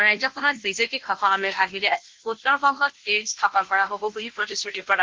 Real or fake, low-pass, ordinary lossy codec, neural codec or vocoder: fake; 7.2 kHz; Opus, 16 kbps; codec, 16 kHz, 0.5 kbps, FunCodec, trained on Chinese and English, 25 frames a second